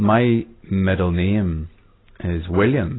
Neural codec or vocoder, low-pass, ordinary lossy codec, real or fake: none; 7.2 kHz; AAC, 16 kbps; real